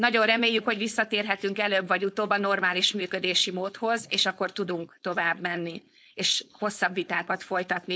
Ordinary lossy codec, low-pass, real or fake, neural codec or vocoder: none; none; fake; codec, 16 kHz, 4.8 kbps, FACodec